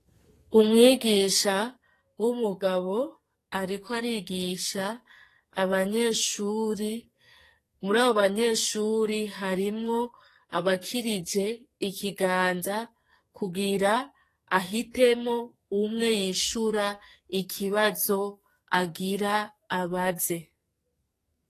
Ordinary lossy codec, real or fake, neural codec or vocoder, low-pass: AAC, 48 kbps; fake; codec, 44.1 kHz, 2.6 kbps, SNAC; 14.4 kHz